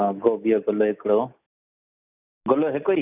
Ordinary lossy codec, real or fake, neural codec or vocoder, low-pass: none; real; none; 3.6 kHz